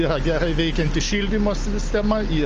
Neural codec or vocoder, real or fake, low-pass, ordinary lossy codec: none; real; 7.2 kHz; Opus, 24 kbps